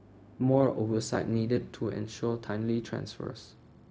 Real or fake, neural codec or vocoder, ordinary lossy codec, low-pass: fake; codec, 16 kHz, 0.4 kbps, LongCat-Audio-Codec; none; none